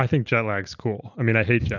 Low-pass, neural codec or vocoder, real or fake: 7.2 kHz; none; real